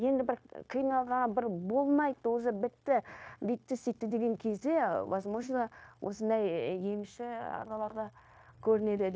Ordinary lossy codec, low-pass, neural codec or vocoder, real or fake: none; none; codec, 16 kHz, 0.9 kbps, LongCat-Audio-Codec; fake